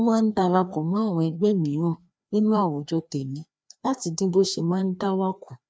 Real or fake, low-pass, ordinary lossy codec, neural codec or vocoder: fake; none; none; codec, 16 kHz, 2 kbps, FreqCodec, larger model